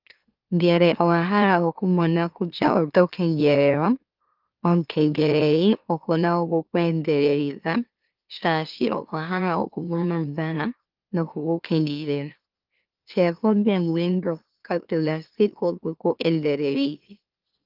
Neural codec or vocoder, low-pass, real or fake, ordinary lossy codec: autoencoder, 44.1 kHz, a latent of 192 numbers a frame, MeloTTS; 5.4 kHz; fake; Opus, 32 kbps